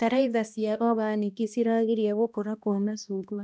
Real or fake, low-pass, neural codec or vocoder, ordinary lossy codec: fake; none; codec, 16 kHz, 1 kbps, X-Codec, HuBERT features, trained on balanced general audio; none